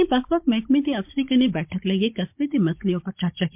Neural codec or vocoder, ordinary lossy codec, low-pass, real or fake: codec, 16 kHz, 16 kbps, FunCodec, trained on Chinese and English, 50 frames a second; MP3, 32 kbps; 3.6 kHz; fake